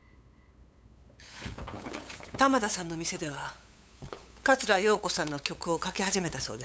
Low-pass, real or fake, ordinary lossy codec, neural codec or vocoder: none; fake; none; codec, 16 kHz, 8 kbps, FunCodec, trained on LibriTTS, 25 frames a second